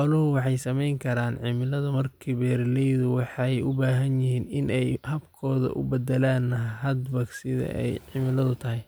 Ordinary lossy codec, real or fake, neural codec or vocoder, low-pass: none; real; none; none